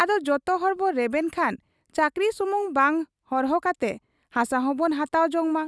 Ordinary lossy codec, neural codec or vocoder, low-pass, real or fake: none; none; none; real